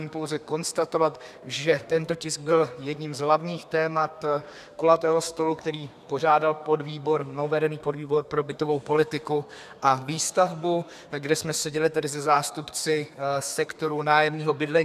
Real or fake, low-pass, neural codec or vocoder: fake; 14.4 kHz; codec, 32 kHz, 1.9 kbps, SNAC